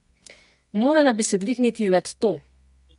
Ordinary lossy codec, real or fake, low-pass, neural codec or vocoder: MP3, 64 kbps; fake; 10.8 kHz; codec, 24 kHz, 0.9 kbps, WavTokenizer, medium music audio release